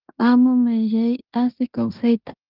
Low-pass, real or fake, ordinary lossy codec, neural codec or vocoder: 5.4 kHz; fake; Opus, 32 kbps; codec, 16 kHz in and 24 kHz out, 0.9 kbps, LongCat-Audio-Codec, fine tuned four codebook decoder